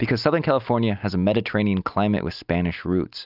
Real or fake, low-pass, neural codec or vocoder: real; 5.4 kHz; none